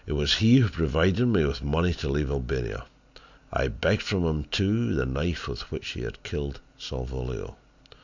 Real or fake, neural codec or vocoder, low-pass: real; none; 7.2 kHz